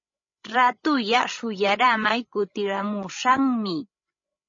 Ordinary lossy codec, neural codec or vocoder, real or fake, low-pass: MP3, 32 kbps; codec, 16 kHz, 16 kbps, FreqCodec, larger model; fake; 7.2 kHz